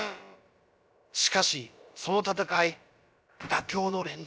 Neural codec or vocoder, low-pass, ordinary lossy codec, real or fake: codec, 16 kHz, about 1 kbps, DyCAST, with the encoder's durations; none; none; fake